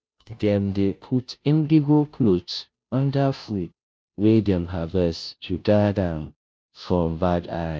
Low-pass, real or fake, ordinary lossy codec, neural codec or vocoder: none; fake; none; codec, 16 kHz, 0.5 kbps, FunCodec, trained on Chinese and English, 25 frames a second